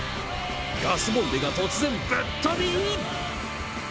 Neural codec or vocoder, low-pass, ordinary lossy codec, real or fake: none; none; none; real